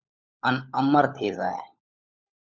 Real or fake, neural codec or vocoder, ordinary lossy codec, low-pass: fake; codec, 16 kHz, 16 kbps, FunCodec, trained on LibriTTS, 50 frames a second; MP3, 64 kbps; 7.2 kHz